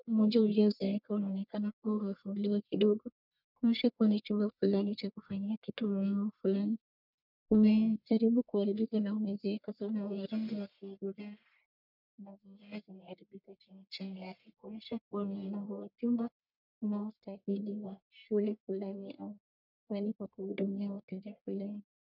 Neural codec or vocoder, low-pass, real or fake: codec, 44.1 kHz, 1.7 kbps, Pupu-Codec; 5.4 kHz; fake